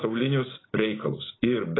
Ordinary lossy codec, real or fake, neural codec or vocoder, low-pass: AAC, 16 kbps; real; none; 7.2 kHz